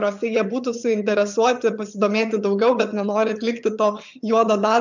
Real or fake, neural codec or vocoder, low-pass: fake; vocoder, 22.05 kHz, 80 mel bands, HiFi-GAN; 7.2 kHz